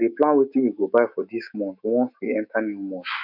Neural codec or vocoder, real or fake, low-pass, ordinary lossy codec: autoencoder, 48 kHz, 128 numbers a frame, DAC-VAE, trained on Japanese speech; fake; 5.4 kHz; none